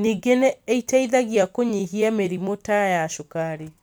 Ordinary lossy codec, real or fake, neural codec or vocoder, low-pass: none; fake; vocoder, 44.1 kHz, 128 mel bands every 256 samples, BigVGAN v2; none